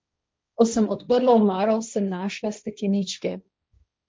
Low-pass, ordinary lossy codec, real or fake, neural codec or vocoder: none; none; fake; codec, 16 kHz, 1.1 kbps, Voila-Tokenizer